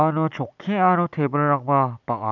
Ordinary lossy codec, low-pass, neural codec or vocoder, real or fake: none; 7.2 kHz; none; real